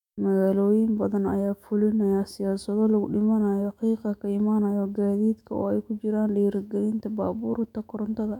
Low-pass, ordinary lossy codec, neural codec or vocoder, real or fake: 19.8 kHz; none; autoencoder, 48 kHz, 128 numbers a frame, DAC-VAE, trained on Japanese speech; fake